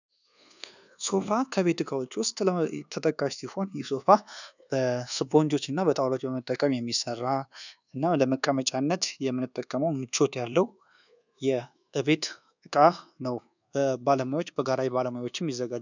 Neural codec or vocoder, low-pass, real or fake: codec, 24 kHz, 1.2 kbps, DualCodec; 7.2 kHz; fake